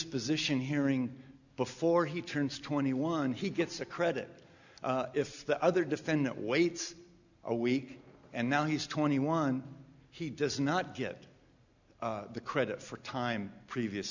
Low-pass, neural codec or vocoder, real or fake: 7.2 kHz; none; real